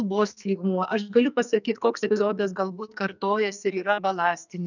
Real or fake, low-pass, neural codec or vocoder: fake; 7.2 kHz; codec, 44.1 kHz, 2.6 kbps, SNAC